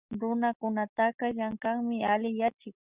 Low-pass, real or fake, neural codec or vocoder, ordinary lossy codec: 3.6 kHz; real; none; Opus, 64 kbps